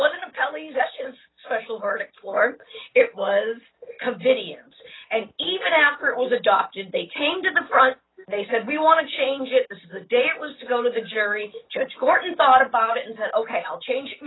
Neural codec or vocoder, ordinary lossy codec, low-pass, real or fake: none; AAC, 16 kbps; 7.2 kHz; real